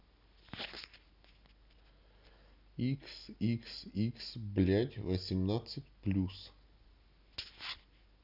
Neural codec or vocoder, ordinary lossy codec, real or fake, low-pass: none; none; real; 5.4 kHz